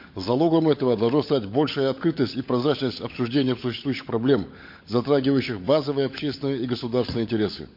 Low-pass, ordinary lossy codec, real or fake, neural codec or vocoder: 5.4 kHz; MP3, 32 kbps; fake; codec, 16 kHz, 16 kbps, FunCodec, trained on LibriTTS, 50 frames a second